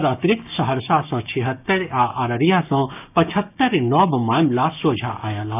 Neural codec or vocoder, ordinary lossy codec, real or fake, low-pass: codec, 16 kHz, 6 kbps, DAC; none; fake; 3.6 kHz